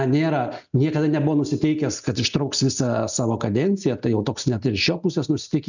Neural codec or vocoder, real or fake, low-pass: none; real; 7.2 kHz